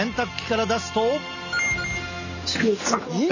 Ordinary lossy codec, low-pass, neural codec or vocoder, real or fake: none; 7.2 kHz; none; real